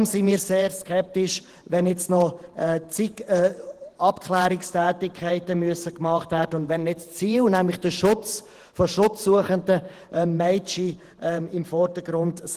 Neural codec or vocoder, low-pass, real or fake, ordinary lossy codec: vocoder, 48 kHz, 128 mel bands, Vocos; 14.4 kHz; fake; Opus, 16 kbps